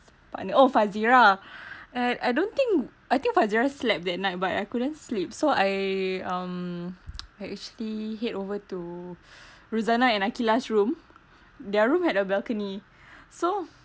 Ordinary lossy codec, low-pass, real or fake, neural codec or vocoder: none; none; real; none